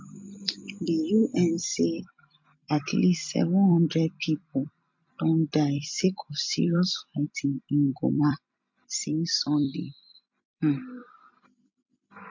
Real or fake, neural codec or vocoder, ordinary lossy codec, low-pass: fake; vocoder, 44.1 kHz, 128 mel bands every 256 samples, BigVGAN v2; MP3, 48 kbps; 7.2 kHz